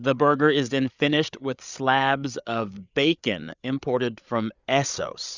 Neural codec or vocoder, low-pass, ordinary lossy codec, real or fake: codec, 16 kHz, 8 kbps, FreqCodec, larger model; 7.2 kHz; Opus, 64 kbps; fake